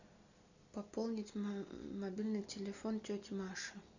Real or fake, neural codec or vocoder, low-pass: real; none; 7.2 kHz